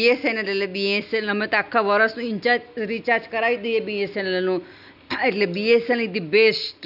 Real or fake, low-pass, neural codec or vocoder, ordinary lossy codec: real; 5.4 kHz; none; none